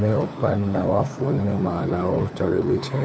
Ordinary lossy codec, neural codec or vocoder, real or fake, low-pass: none; codec, 16 kHz, 4 kbps, FunCodec, trained on LibriTTS, 50 frames a second; fake; none